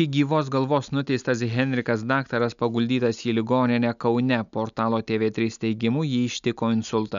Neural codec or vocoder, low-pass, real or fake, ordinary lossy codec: none; 7.2 kHz; real; MP3, 96 kbps